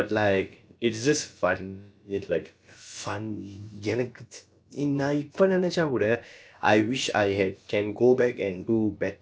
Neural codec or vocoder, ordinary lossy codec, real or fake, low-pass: codec, 16 kHz, about 1 kbps, DyCAST, with the encoder's durations; none; fake; none